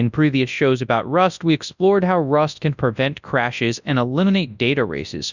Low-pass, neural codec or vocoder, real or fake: 7.2 kHz; codec, 24 kHz, 0.9 kbps, WavTokenizer, large speech release; fake